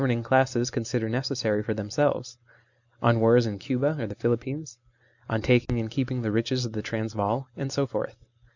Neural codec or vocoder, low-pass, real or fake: none; 7.2 kHz; real